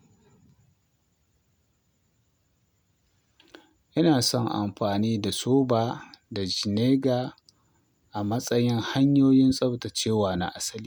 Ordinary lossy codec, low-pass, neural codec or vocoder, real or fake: none; none; none; real